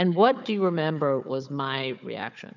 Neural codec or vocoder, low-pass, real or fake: codec, 16 kHz, 4 kbps, FunCodec, trained on Chinese and English, 50 frames a second; 7.2 kHz; fake